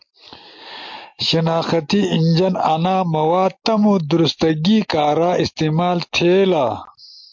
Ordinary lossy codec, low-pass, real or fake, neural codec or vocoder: MP3, 48 kbps; 7.2 kHz; real; none